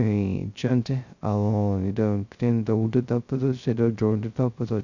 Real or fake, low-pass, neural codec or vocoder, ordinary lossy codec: fake; 7.2 kHz; codec, 16 kHz, 0.2 kbps, FocalCodec; none